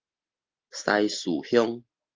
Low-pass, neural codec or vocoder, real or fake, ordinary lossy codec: 7.2 kHz; none; real; Opus, 24 kbps